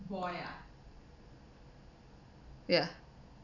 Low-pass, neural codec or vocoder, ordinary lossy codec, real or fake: 7.2 kHz; none; none; real